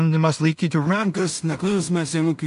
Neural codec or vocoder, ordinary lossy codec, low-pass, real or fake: codec, 16 kHz in and 24 kHz out, 0.4 kbps, LongCat-Audio-Codec, two codebook decoder; MP3, 64 kbps; 10.8 kHz; fake